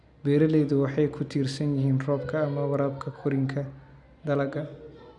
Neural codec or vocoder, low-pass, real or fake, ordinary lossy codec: none; 10.8 kHz; real; MP3, 96 kbps